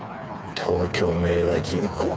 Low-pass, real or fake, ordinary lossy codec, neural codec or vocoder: none; fake; none; codec, 16 kHz, 4 kbps, FreqCodec, smaller model